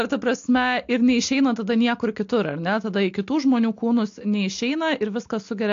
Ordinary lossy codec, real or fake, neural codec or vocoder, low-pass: AAC, 48 kbps; real; none; 7.2 kHz